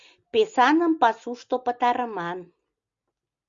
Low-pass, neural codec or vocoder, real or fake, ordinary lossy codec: 7.2 kHz; none; real; Opus, 64 kbps